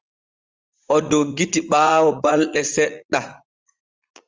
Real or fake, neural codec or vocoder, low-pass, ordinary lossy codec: fake; vocoder, 44.1 kHz, 80 mel bands, Vocos; 7.2 kHz; Opus, 32 kbps